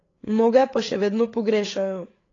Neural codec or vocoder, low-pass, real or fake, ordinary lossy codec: codec, 16 kHz, 8 kbps, FreqCodec, larger model; 7.2 kHz; fake; AAC, 32 kbps